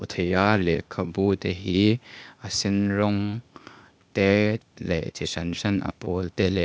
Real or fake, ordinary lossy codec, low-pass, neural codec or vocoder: fake; none; none; codec, 16 kHz, 0.8 kbps, ZipCodec